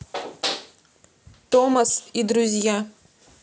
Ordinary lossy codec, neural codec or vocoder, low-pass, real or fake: none; none; none; real